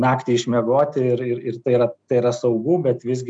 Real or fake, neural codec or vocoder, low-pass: real; none; 9.9 kHz